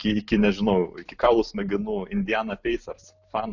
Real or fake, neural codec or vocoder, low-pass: real; none; 7.2 kHz